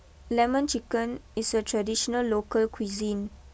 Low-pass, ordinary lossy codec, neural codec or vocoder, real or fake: none; none; none; real